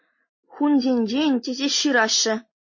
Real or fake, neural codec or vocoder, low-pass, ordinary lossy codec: real; none; 7.2 kHz; MP3, 32 kbps